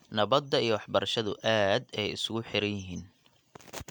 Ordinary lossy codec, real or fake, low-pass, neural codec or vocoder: MP3, 96 kbps; real; 19.8 kHz; none